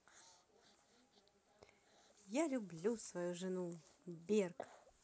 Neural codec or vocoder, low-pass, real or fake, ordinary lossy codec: none; none; real; none